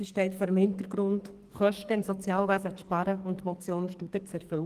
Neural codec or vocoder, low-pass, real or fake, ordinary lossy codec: codec, 44.1 kHz, 2.6 kbps, SNAC; 14.4 kHz; fake; Opus, 24 kbps